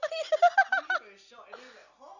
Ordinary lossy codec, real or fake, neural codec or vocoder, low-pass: none; real; none; 7.2 kHz